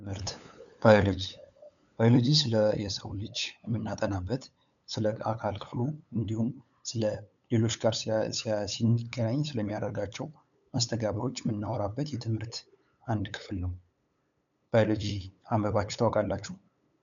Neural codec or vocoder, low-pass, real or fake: codec, 16 kHz, 8 kbps, FunCodec, trained on LibriTTS, 25 frames a second; 7.2 kHz; fake